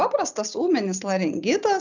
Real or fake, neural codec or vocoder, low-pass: real; none; 7.2 kHz